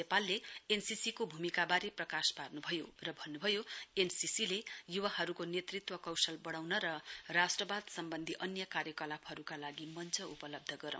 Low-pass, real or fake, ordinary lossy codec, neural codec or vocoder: none; real; none; none